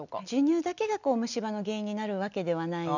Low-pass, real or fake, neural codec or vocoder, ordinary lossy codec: 7.2 kHz; real; none; none